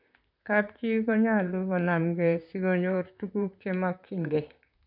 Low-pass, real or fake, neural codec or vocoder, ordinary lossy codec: 5.4 kHz; fake; vocoder, 44.1 kHz, 128 mel bands, Pupu-Vocoder; none